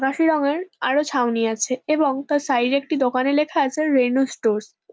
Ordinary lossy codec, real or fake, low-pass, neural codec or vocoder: none; real; none; none